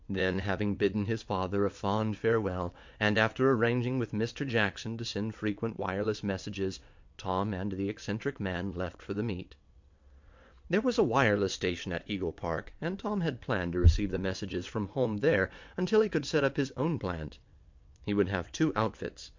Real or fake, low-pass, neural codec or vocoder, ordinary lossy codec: fake; 7.2 kHz; vocoder, 22.05 kHz, 80 mel bands, WaveNeXt; MP3, 64 kbps